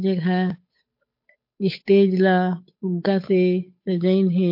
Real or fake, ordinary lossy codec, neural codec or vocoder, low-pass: fake; MP3, 32 kbps; codec, 16 kHz, 8 kbps, FunCodec, trained on Chinese and English, 25 frames a second; 5.4 kHz